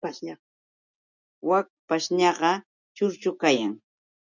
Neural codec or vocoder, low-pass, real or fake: none; 7.2 kHz; real